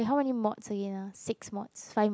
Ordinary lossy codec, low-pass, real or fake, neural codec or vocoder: none; none; real; none